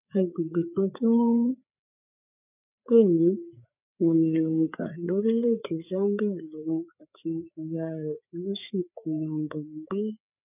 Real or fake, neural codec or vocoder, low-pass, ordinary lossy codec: fake; codec, 16 kHz, 4 kbps, FreqCodec, larger model; 3.6 kHz; none